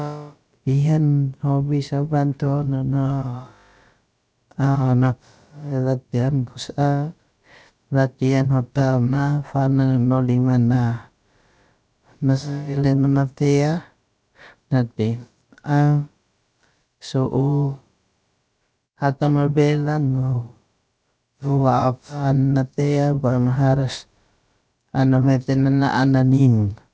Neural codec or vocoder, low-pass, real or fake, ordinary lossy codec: codec, 16 kHz, about 1 kbps, DyCAST, with the encoder's durations; none; fake; none